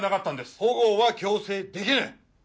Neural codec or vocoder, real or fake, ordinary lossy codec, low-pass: none; real; none; none